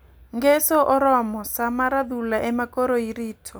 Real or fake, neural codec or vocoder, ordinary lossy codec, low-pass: real; none; none; none